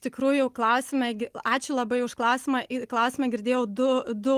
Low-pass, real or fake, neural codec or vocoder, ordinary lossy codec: 14.4 kHz; real; none; Opus, 24 kbps